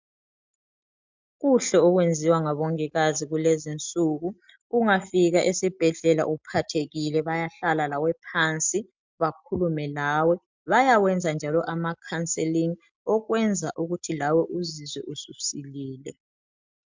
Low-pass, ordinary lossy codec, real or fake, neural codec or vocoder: 7.2 kHz; MP3, 64 kbps; real; none